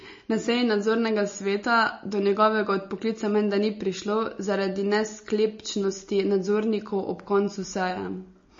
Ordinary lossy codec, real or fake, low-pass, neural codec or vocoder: MP3, 32 kbps; real; 7.2 kHz; none